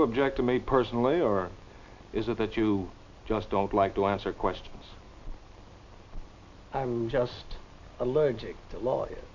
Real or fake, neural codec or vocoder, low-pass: real; none; 7.2 kHz